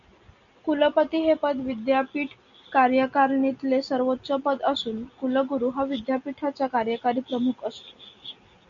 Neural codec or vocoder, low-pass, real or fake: none; 7.2 kHz; real